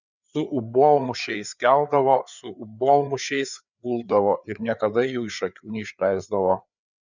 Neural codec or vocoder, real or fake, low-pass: codec, 16 kHz, 4 kbps, FreqCodec, larger model; fake; 7.2 kHz